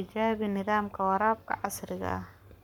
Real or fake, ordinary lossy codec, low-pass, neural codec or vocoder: fake; none; 19.8 kHz; codec, 44.1 kHz, 7.8 kbps, Pupu-Codec